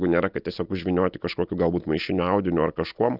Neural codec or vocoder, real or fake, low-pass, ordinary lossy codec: none; real; 5.4 kHz; Opus, 32 kbps